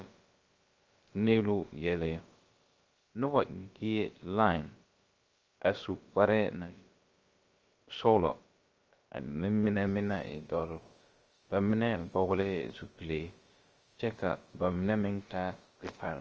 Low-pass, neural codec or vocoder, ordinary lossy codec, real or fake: 7.2 kHz; codec, 16 kHz, about 1 kbps, DyCAST, with the encoder's durations; Opus, 24 kbps; fake